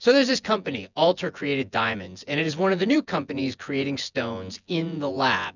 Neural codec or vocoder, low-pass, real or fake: vocoder, 24 kHz, 100 mel bands, Vocos; 7.2 kHz; fake